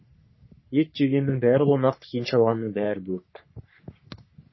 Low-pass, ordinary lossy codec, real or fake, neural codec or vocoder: 7.2 kHz; MP3, 24 kbps; fake; codec, 44.1 kHz, 3.4 kbps, Pupu-Codec